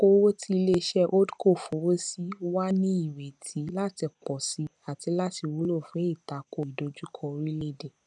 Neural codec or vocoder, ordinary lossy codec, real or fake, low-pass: none; none; real; 10.8 kHz